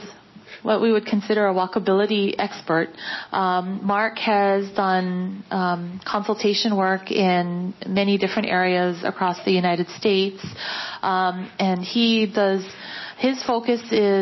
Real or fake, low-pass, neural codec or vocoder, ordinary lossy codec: real; 7.2 kHz; none; MP3, 24 kbps